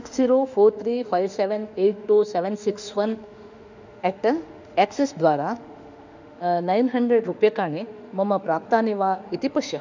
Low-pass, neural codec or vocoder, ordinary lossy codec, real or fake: 7.2 kHz; autoencoder, 48 kHz, 32 numbers a frame, DAC-VAE, trained on Japanese speech; none; fake